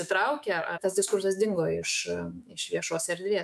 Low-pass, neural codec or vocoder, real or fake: 14.4 kHz; autoencoder, 48 kHz, 128 numbers a frame, DAC-VAE, trained on Japanese speech; fake